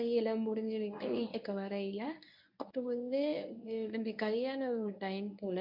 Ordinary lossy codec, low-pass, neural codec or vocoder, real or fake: none; 5.4 kHz; codec, 24 kHz, 0.9 kbps, WavTokenizer, medium speech release version 1; fake